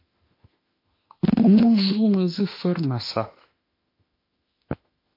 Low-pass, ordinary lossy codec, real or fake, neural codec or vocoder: 5.4 kHz; MP3, 32 kbps; fake; autoencoder, 48 kHz, 32 numbers a frame, DAC-VAE, trained on Japanese speech